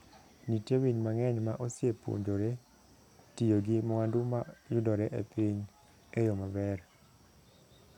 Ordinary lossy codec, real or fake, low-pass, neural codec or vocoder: none; real; 19.8 kHz; none